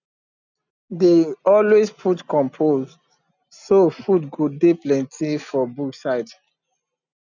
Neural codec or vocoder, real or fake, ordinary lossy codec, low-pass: none; real; none; 7.2 kHz